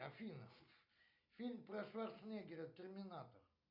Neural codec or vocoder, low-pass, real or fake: none; 5.4 kHz; real